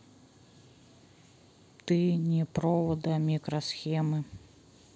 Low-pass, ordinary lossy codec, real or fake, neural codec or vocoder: none; none; real; none